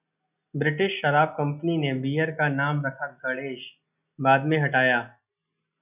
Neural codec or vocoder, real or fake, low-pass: none; real; 3.6 kHz